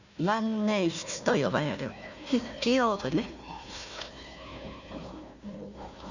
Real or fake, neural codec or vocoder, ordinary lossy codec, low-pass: fake; codec, 16 kHz, 1 kbps, FunCodec, trained on Chinese and English, 50 frames a second; none; 7.2 kHz